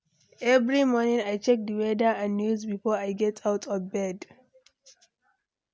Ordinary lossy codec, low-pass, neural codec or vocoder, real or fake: none; none; none; real